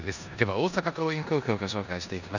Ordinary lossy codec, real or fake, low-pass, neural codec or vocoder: none; fake; 7.2 kHz; codec, 16 kHz in and 24 kHz out, 0.9 kbps, LongCat-Audio-Codec, four codebook decoder